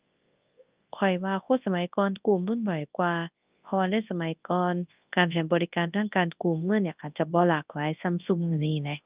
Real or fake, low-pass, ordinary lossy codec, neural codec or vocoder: fake; 3.6 kHz; Opus, 24 kbps; codec, 24 kHz, 0.9 kbps, WavTokenizer, large speech release